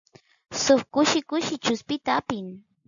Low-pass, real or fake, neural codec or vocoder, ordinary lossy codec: 7.2 kHz; real; none; MP3, 96 kbps